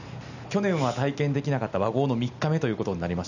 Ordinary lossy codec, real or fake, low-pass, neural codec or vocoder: none; real; 7.2 kHz; none